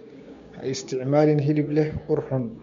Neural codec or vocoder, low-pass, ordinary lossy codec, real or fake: codec, 16 kHz, 6 kbps, DAC; 7.2 kHz; MP3, 64 kbps; fake